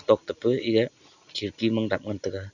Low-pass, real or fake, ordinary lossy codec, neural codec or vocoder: 7.2 kHz; real; none; none